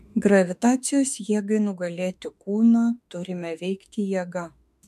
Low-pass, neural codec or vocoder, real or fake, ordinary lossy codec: 14.4 kHz; autoencoder, 48 kHz, 32 numbers a frame, DAC-VAE, trained on Japanese speech; fake; MP3, 96 kbps